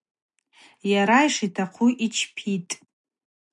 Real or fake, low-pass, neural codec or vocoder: real; 10.8 kHz; none